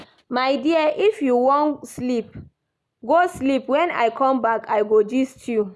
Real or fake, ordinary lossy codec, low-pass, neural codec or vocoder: real; none; none; none